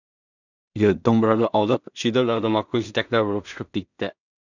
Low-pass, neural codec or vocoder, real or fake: 7.2 kHz; codec, 16 kHz in and 24 kHz out, 0.4 kbps, LongCat-Audio-Codec, two codebook decoder; fake